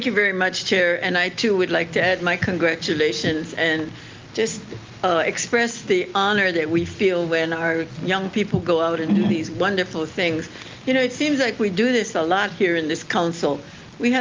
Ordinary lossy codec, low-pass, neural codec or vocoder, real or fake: Opus, 32 kbps; 7.2 kHz; none; real